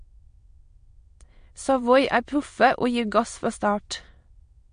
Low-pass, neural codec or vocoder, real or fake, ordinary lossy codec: 9.9 kHz; autoencoder, 22.05 kHz, a latent of 192 numbers a frame, VITS, trained on many speakers; fake; MP3, 48 kbps